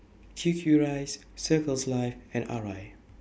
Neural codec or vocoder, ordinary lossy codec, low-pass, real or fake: none; none; none; real